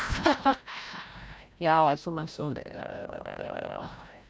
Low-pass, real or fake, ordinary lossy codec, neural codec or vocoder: none; fake; none; codec, 16 kHz, 0.5 kbps, FreqCodec, larger model